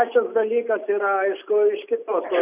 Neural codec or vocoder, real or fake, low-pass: none; real; 3.6 kHz